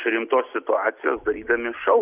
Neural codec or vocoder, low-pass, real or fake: none; 3.6 kHz; real